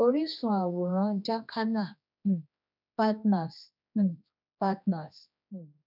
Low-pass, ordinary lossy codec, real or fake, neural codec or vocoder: 5.4 kHz; none; fake; codec, 16 kHz, 2 kbps, X-Codec, HuBERT features, trained on general audio